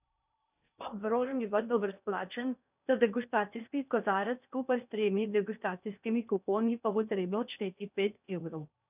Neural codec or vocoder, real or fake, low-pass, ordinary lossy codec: codec, 16 kHz in and 24 kHz out, 0.6 kbps, FocalCodec, streaming, 4096 codes; fake; 3.6 kHz; none